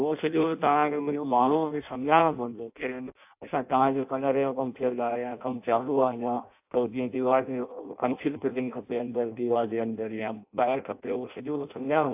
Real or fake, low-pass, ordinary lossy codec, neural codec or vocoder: fake; 3.6 kHz; AAC, 32 kbps; codec, 16 kHz in and 24 kHz out, 0.6 kbps, FireRedTTS-2 codec